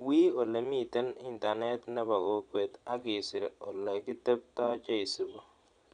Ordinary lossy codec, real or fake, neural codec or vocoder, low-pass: none; fake; vocoder, 22.05 kHz, 80 mel bands, Vocos; 9.9 kHz